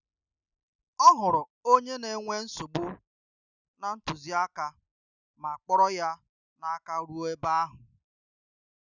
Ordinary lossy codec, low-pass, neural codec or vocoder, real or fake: none; 7.2 kHz; none; real